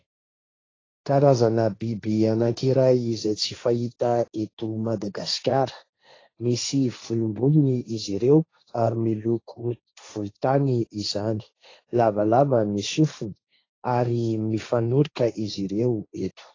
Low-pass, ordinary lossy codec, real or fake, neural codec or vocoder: 7.2 kHz; AAC, 32 kbps; fake; codec, 16 kHz, 1.1 kbps, Voila-Tokenizer